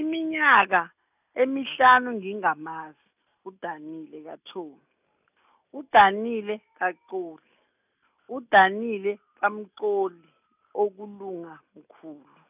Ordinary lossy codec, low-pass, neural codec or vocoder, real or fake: none; 3.6 kHz; none; real